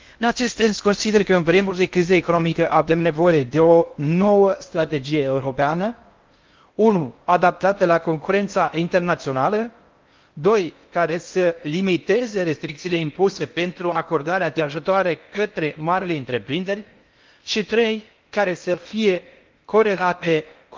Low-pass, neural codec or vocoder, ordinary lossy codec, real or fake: 7.2 kHz; codec, 16 kHz in and 24 kHz out, 0.6 kbps, FocalCodec, streaming, 4096 codes; Opus, 32 kbps; fake